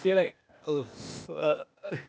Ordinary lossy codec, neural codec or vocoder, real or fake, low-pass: none; codec, 16 kHz, 0.8 kbps, ZipCodec; fake; none